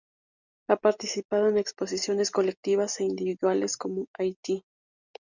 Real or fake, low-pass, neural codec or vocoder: real; 7.2 kHz; none